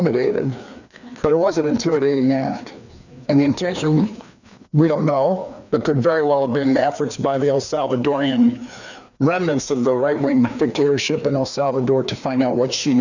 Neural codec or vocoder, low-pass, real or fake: codec, 16 kHz, 2 kbps, FreqCodec, larger model; 7.2 kHz; fake